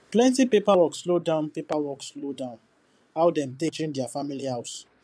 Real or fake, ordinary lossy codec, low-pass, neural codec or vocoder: fake; none; none; vocoder, 22.05 kHz, 80 mel bands, Vocos